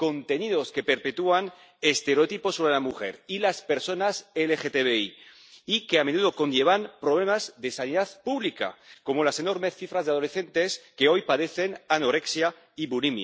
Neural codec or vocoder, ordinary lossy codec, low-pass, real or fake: none; none; none; real